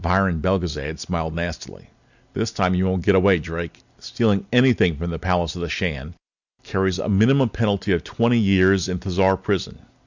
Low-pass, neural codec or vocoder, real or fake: 7.2 kHz; none; real